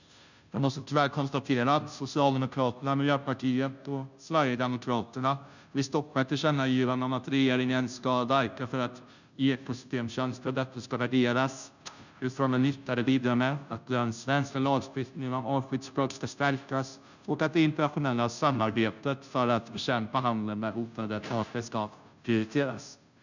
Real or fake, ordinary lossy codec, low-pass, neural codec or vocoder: fake; none; 7.2 kHz; codec, 16 kHz, 0.5 kbps, FunCodec, trained on Chinese and English, 25 frames a second